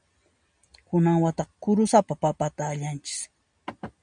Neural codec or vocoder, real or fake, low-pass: none; real; 9.9 kHz